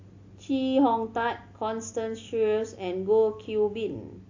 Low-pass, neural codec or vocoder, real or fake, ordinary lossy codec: 7.2 kHz; none; real; AAC, 48 kbps